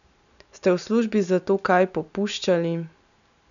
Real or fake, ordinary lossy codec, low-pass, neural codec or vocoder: real; none; 7.2 kHz; none